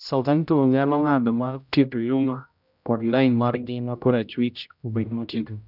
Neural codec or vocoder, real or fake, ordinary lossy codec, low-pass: codec, 16 kHz, 0.5 kbps, X-Codec, HuBERT features, trained on general audio; fake; none; 5.4 kHz